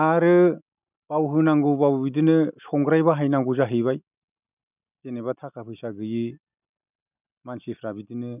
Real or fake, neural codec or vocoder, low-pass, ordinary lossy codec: real; none; 3.6 kHz; none